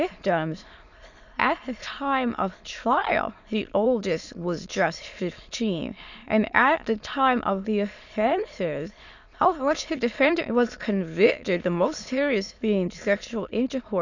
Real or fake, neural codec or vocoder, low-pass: fake; autoencoder, 22.05 kHz, a latent of 192 numbers a frame, VITS, trained on many speakers; 7.2 kHz